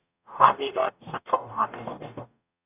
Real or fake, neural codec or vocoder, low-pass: fake; codec, 44.1 kHz, 0.9 kbps, DAC; 3.6 kHz